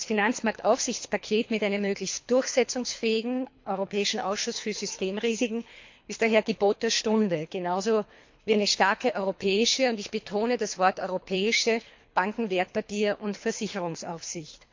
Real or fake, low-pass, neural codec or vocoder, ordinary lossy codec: fake; 7.2 kHz; codec, 24 kHz, 3 kbps, HILCodec; MP3, 48 kbps